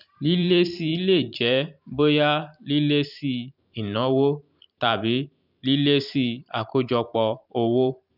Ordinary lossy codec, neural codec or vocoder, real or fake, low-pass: none; vocoder, 44.1 kHz, 128 mel bands every 512 samples, BigVGAN v2; fake; 5.4 kHz